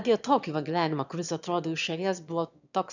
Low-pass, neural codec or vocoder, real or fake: 7.2 kHz; autoencoder, 22.05 kHz, a latent of 192 numbers a frame, VITS, trained on one speaker; fake